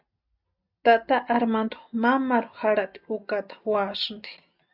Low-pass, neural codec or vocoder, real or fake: 5.4 kHz; none; real